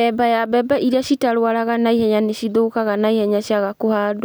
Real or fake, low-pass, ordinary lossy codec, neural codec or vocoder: real; none; none; none